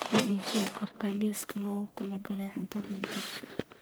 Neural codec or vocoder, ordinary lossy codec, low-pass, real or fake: codec, 44.1 kHz, 1.7 kbps, Pupu-Codec; none; none; fake